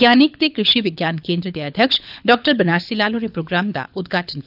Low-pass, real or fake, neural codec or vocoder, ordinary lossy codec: 5.4 kHz; fake; codec, 24 kHz, 6 kbps, HILCodec; none